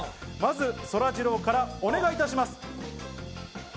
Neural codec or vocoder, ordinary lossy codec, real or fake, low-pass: none; none; real; none